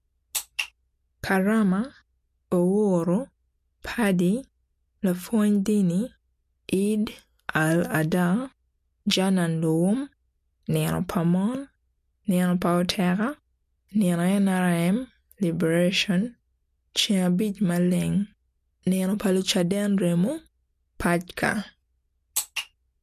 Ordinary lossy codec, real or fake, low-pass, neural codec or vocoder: MP3, 64 kbps; real; 14.4 kHz; none